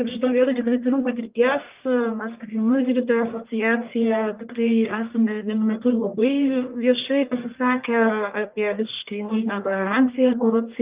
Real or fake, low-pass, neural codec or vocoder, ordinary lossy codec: fake; 3.6 kHz; codec, 44.1 kHz, 1.7 kbps, Pupu-Codec; Opus, 32 kbps